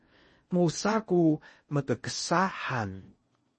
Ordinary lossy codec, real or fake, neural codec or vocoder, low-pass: MP3, 32 kbps; fake; codec, 16 kHz in and 24 kHz out, 0.8 kbps, FocalCodec, streaming, 65536 codes; 10.8 kHz